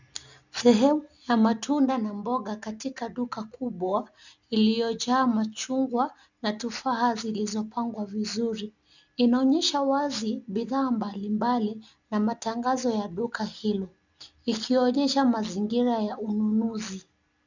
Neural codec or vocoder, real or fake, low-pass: none; real; 7.2 kHz